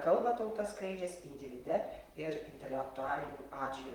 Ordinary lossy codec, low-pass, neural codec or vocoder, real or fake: Opus, 32 kbps; 19.8 kHz; vocoder, 44.1 kHz, 128 mel bands, Pupu-Vocoder; fake